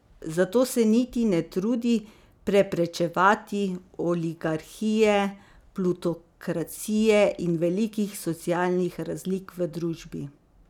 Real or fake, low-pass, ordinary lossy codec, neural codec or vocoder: real; 19.8 kHz; none; none